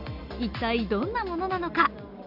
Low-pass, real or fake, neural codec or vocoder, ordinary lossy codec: 5.4 kHz; real; none; none